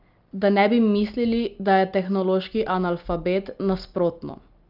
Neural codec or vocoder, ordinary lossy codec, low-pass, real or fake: none; Opus, 24 kbps; 5.4 kHz; real